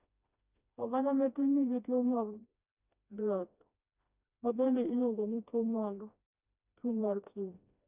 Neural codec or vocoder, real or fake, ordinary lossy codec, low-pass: codec, 16 kHz, 1 kbps, FreqCodec, smaller model; fake; none; 3.6 kHz